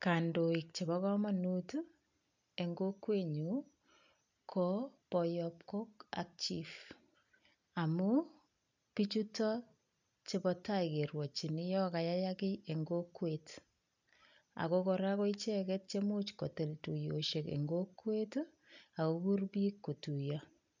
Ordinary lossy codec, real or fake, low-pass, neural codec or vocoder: none; real; 7.2 kHz; none